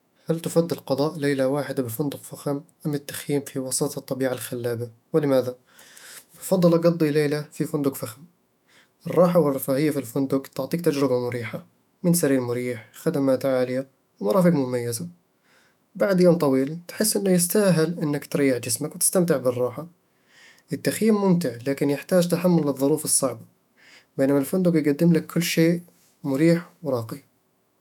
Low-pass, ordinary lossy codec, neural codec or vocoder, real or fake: 19.8 kHz; none; autoencoder, 48 kHz, 128 numbers a frame, DAC-VAE, trained on Japanese speech; fake